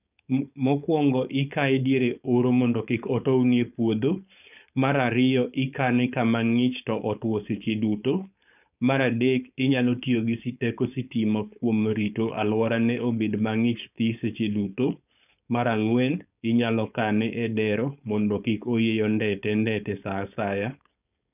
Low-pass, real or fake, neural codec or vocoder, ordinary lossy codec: 3.6 kHz; fake; codec, 16 kHz, 4.8 kbps, FACodec; none